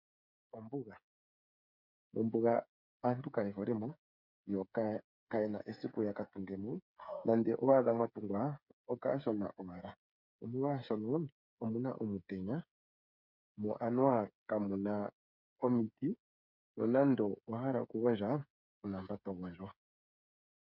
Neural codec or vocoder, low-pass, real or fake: codec, 16 kHz, 8 kbps, FreqCodec, smaller model; 5.4 kHz; fake